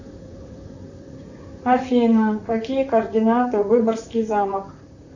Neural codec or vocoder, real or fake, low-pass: vocoder, 44.1 kHz, 128 mel bands, Pupu-Vocoder; fake; 7.2 kHz